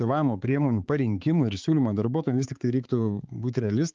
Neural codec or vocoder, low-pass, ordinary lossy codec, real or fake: codec, 16 kHz, 4 kbps, X-Codec, HuBERT features, trained on balanced general audio; 7.2 kHz; Opus, 24 kbps; fake